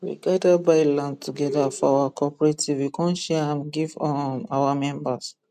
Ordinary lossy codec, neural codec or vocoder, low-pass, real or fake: none; none; 14.4 kHz; real